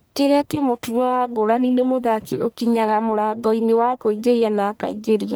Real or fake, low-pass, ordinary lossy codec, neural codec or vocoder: fake; none; none; codec, 44.1 kHz, 1.7 kbps, Pupu-Codec